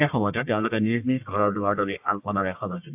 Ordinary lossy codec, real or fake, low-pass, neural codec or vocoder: AAC, 32 kbps; fake; 3.6 kHz; codec, 44.1 kHz, 1.7 kbps, Pupu-Codec